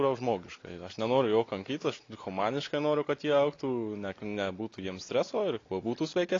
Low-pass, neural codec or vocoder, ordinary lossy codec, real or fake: 7.2 kHz; none; AAC, 32 kbps; real